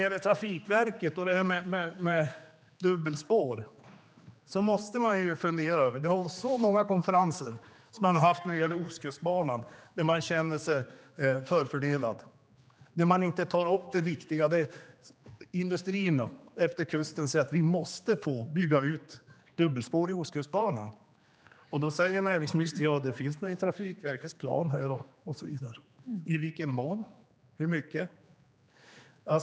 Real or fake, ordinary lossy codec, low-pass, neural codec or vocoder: fake; none; none; codec, 16 kHz, 2 kbps, X-Codec, HuBERT features, trained on general audio